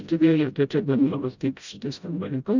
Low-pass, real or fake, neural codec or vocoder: 7.2 kHz; fake; codec, 16 kHz, 0.5 kbps, FreqCodec, smaller model